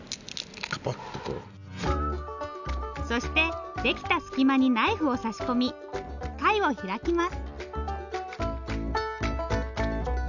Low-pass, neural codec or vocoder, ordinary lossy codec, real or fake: 7.2 kHz; none; none; real